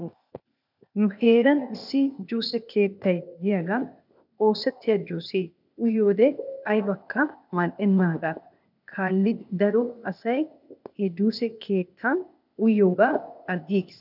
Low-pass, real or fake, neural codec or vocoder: 5.4 kHz; fake; codec, 16 kHz, 0.8 kbps, ZipCodec